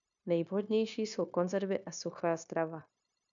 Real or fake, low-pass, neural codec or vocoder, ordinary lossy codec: fake; 7.2 kHz; codec, 16 kHz, 0.9 kbps, LongCat-Audio-Codec; MP3, 96 kbps